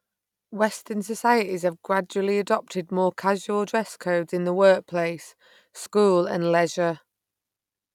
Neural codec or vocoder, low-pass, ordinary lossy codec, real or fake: none; 19.8 kHz; none; real